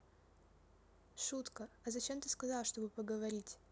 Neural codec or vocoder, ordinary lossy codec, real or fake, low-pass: none; none; real; none